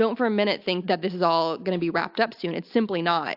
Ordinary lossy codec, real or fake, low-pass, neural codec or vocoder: AAC, 48 kbps; real; 5.4 kHz; none